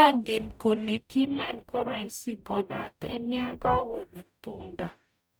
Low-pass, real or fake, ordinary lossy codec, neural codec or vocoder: none; fake; none; codec, 44.1 kHz, 0.9 kbps, DAC